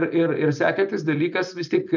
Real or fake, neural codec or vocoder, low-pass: real; none; 7.2 kHz